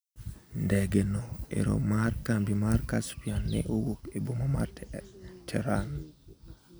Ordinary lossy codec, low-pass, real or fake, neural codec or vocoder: none; none; real; none